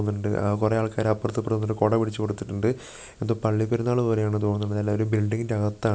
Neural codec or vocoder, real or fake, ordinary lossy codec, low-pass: none; real; none; none